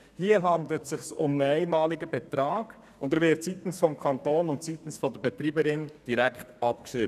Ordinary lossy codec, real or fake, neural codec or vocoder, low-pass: none; fake; codec, 32 kHz, 1.9 kbps, SNAC; 14.4 kHz